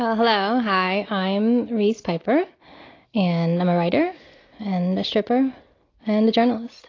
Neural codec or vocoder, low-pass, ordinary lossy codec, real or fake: none; 7.2 kHz; AAC, 32 kbps; real